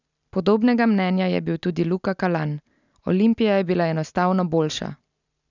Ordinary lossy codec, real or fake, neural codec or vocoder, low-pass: none; real; none; 7.2 kHz